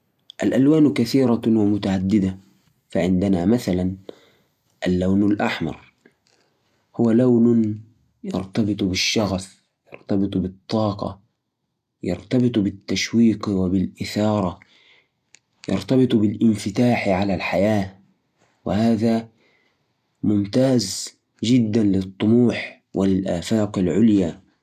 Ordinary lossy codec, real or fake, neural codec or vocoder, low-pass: MP3, 96 kbps; real; none; 14.4 kHz